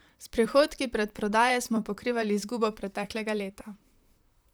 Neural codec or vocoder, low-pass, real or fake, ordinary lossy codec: vocoder, 44.1 kHz, 128 mel bands, Pupu-Vocoder; none; fake; none